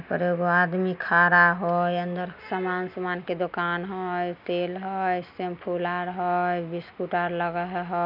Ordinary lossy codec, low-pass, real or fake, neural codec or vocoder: none; 5.4 kHz; real; none